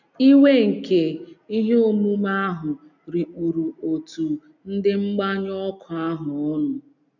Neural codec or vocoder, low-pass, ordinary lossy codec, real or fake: none; 7.2 kHz; none; real